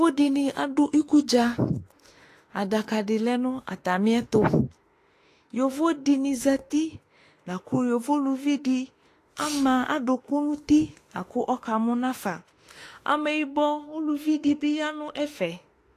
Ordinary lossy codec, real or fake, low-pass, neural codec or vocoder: AAC, 48 kbps; fake; 14.4 kHz; autoencoder, 48 kHz, 32 numbers a frame, DAC-VAE, trained on Japanese speech